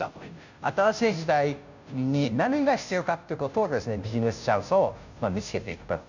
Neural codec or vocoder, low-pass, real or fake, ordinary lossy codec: codec, 16 kHz, 0.5 kbps, FunCodec, trained on Chinese and English, 25 frames a second; 7.2 kHz; fake; none